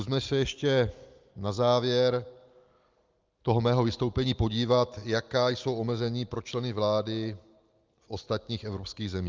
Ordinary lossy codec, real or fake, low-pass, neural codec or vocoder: Opus, 32 kbps; real; 7.2 kHz; none